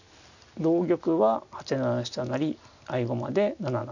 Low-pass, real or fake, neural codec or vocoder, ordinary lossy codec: 7.2 kHz; real; none; none